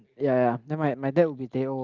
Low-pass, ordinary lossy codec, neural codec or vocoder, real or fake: 7.2 kHz; Opus, 32 kbps; none; real